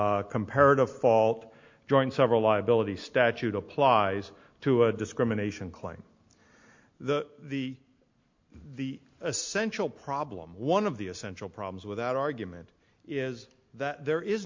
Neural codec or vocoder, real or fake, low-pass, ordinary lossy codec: none; real; 7.2 kHz; MP3, 48 kbps